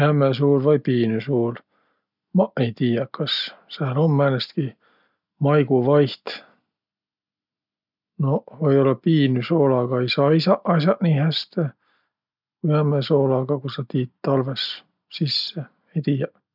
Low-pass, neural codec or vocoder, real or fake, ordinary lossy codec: 5.4 kHz; none; real; none